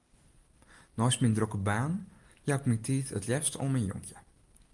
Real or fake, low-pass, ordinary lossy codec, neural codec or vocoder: real; 10.8 kHz; Opus, 32 kbps; none